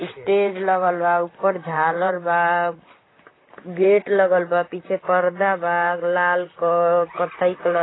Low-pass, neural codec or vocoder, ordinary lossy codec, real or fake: 7.2 kHz; vocoder, 44.1 kHz, 128 mel bands, Pupu-Vocoder; AAC, 16 kbps; fake